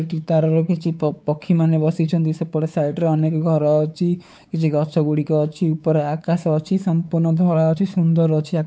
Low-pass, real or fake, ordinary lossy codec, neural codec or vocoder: none; fake; none; codec, 16 kHz, 4 kbps, X-Codec, WavLM features, trained on Multilingual LibriSpeech